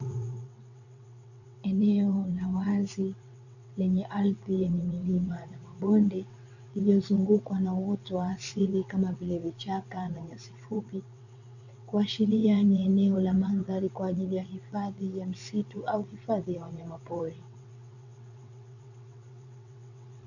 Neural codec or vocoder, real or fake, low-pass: vocoder, 22.05 kHz, 80 mel bands, WaveNeXt; fake; 7.2 kHz